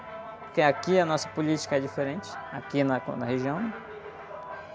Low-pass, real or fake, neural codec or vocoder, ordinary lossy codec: none; real; none; none